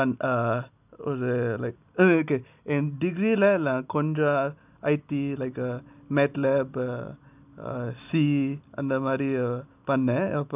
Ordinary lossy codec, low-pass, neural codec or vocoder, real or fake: none; 3.6 kHz; none; real